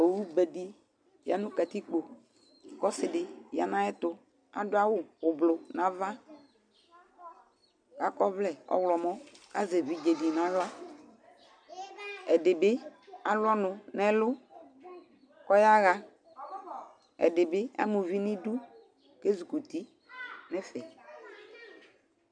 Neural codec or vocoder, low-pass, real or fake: none; 9.9 kHz; real